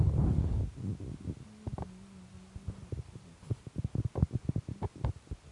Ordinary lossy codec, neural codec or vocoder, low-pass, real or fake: MP3, 48 kbps; none; 10.8 kHz; real